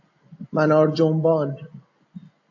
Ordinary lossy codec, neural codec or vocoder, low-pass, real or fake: MP3, 48 kbps; none; 7.2 kHz; real